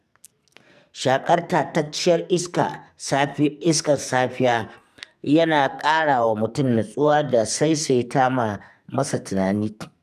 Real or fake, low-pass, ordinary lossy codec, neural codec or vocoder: fake; 14.4 kHz; none; codec, 44.1 kHz, 2.6 kbps, SNAC